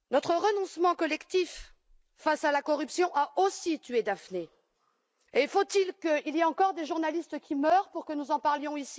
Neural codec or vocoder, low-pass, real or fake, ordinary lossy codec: none; none; real; none